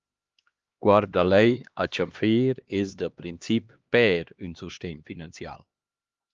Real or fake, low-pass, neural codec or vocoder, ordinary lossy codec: fake; 7.2 kHz; codec, 16 kHz, 2 kbps, X-Codec, HuBERT features, trained on LibriSpeech; Opus, 32 kbps